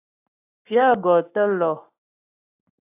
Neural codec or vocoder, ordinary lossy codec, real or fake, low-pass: codec, 44.1 kHz, 7.8 kbps, Pupu-Codec; AAC, 32 kbps; fake; 3.6 kHz